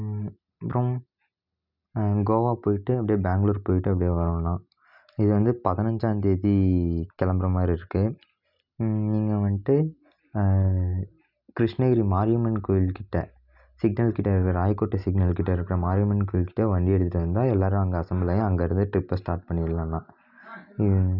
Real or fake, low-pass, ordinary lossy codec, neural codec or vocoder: real; 5.4 kHz; none; none